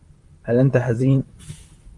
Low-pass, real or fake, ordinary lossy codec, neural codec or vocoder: 10.8 kHz; fake; Opus, 32 kbps; vocoder, 44.1 kHz, 128 mel bands, Pupu-Vocoder